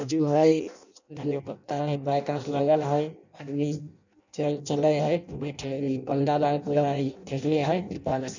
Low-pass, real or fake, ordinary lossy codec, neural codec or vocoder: 7.2 kHz; fake; none; codec, 16 kHz in and 24 kHz out, 0.6 kbps, FireRedTTS-2 codec